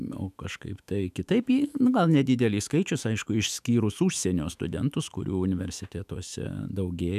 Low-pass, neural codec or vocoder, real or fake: 14.4 kHz; autoencoder, 48 kHz, 128 numbers a frame, DAC-VAE, trained on Japanese speech; fake